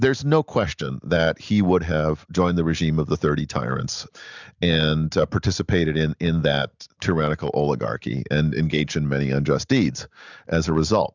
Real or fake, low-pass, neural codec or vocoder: real; 7.2 kHz; none